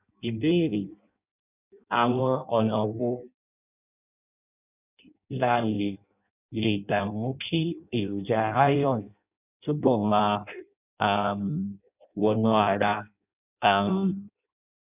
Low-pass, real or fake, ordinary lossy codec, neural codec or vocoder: 3.6 kHz; fake; none; codec, 16 kHz in and 24 kHz out, 0.6 kbps, FireRedTTS-2 codec